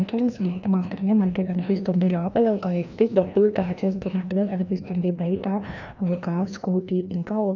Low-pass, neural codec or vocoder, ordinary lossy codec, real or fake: 7.2 kHz; codec, 16 kHz, 1 kbps, FreqCodec, larger model; none; fake